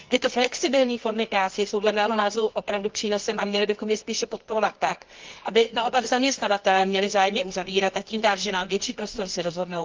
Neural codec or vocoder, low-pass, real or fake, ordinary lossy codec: codec, 24 kHz, 0.9 kbps, WavTokenizer, medium music audio release; 7.2 kHz; fake; Opus, 24 kbps